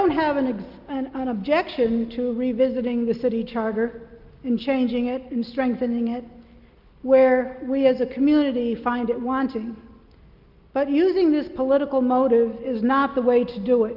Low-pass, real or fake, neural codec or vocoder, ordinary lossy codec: 5.4 kHz; real; none; Opus, 24 kbps